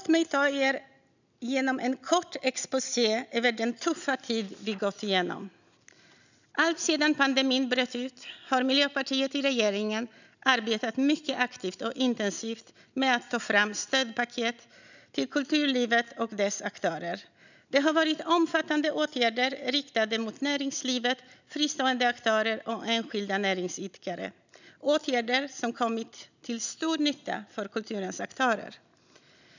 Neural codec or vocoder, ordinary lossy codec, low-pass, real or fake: none; none; 7.2 kHz; real